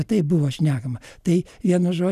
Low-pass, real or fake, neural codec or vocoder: 14.4 kHz; real; none